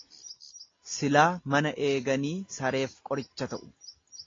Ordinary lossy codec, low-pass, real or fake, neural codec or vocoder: AAC, 32 kbps; 7.2 kHz; real; none